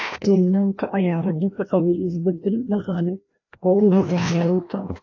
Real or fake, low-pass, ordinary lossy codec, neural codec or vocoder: fake; 7.2 kHz; AAC, 48 kbps; codec, 16 kHz, 1 kbps, FreqCodec, larger model